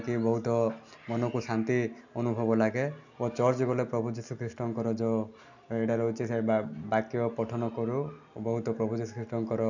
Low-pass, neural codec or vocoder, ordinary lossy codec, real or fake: 7.2 kHz; none; none; real